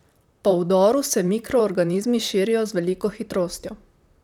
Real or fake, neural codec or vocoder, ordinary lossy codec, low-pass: fake; vocoder, 44.1 kHz, 128 mel bands, Pupu-Vocoder; none; 19.8 kHz